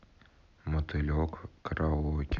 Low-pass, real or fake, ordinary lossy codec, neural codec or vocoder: 7.2 kHz; real; none; none